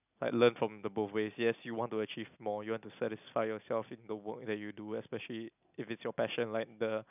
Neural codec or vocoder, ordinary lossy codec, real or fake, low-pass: none; none; real; 3.6 kHz